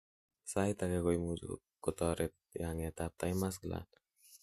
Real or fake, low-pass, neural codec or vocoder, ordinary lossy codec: real; 14.4 kHz; none; MP3, 64 kbps